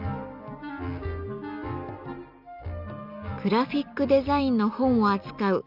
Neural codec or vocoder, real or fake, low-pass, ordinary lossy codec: none; real; 5.4 kHz; none